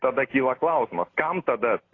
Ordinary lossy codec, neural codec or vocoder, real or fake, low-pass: AAC, 32 kbps; none; real; 7.2 kHz